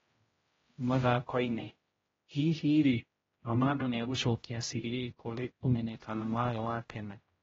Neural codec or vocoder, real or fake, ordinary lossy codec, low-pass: codec, 16 kHz, 0.5 kbps, X-Codec, HuBERT features, trained on general audio; fake; AAC, 24 kbps; 7.2 kHz